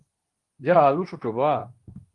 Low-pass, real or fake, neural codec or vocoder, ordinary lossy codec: 10.8 kHz; fake; codec, 24 kHz, 0.9 kbps, WavTokenizer, medium speech release version 2; Opus, 24 kbps